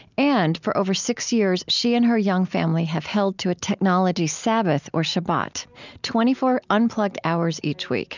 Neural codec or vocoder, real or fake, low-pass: none; real; 7.2 kHz